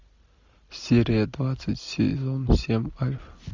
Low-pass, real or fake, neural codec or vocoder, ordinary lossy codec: 7.2 kHz; real; none; MP3, 64 kbps